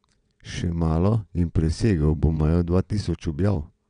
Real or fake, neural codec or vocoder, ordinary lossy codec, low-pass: fake; vocoder, 22.05 kHz, 80 mel bands, WaveNeXt; none; 9.9 kHz